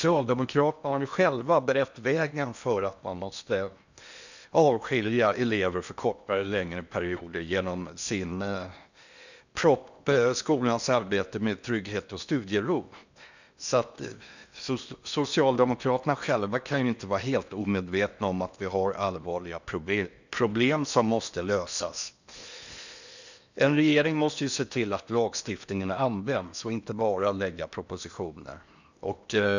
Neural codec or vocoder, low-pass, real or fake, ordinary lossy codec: codec, 16 kHz in and 24 kHz out, 0.8 kbps, FocalCodec, streaming, 65536 codes; 7.2 kHz; fake; none